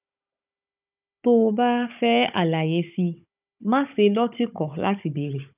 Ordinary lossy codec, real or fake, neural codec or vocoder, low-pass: none; fake; codec, 16 kHz, 16 kbps, FunCodec, trained on Chinese and English, 50 frames a second; 3.6 kHz